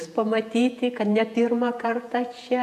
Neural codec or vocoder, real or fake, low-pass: vocoder, 48 kHz, 128 mel bands, Vocos; fake; 14.4 kHz